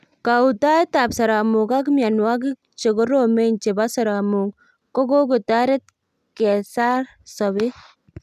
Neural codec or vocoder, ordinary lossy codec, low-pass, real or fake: none; none; 14.4 kHz; real